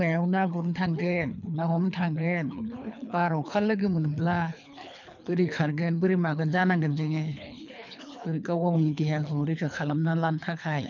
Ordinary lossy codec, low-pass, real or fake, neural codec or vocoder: none; 7.2 kHz; fake; codec, 24 kHz, 3 kbps, HILCodec